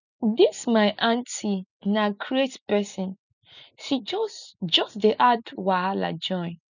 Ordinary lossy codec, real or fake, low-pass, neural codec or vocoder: none; real; 7.2 kHz; none